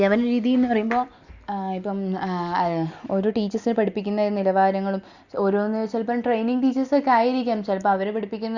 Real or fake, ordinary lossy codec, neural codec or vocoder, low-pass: real; Opus, 64 kbps; none; 7.2 kHz